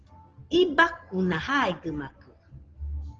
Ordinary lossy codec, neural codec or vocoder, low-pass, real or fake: Opus, 16 kbps; none; 7.2 kHz; real